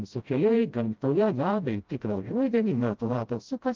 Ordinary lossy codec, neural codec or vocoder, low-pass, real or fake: Opus, 24 kbps; codec, 16 kHz, 0.5 kbps, FreqCodec, smaller model; 7.2 kHz; fake